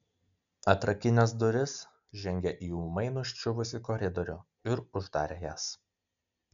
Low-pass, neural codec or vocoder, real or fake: 7.2 kHz; none; real